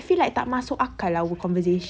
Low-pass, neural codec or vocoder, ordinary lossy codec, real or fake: none; none; none; real